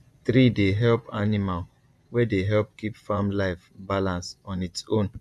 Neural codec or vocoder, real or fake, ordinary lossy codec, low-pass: vocoder, 24 kHz, 100 mel bands, Vocos; fake; none; none